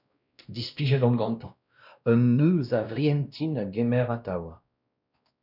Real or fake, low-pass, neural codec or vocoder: fake; 5.4 kHz; codec, 16 kHz, 1 kbps, X-Codec, WavLM features, trained on Multilingual LibriSpeech